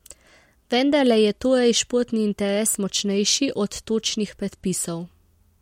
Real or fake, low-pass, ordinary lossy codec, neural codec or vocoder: real; 19.8 kHz; MP3, 64 kbps; none